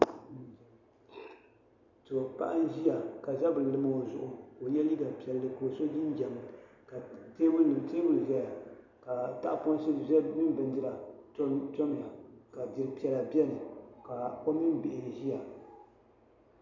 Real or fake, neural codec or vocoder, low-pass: real; none; 7.2 kHz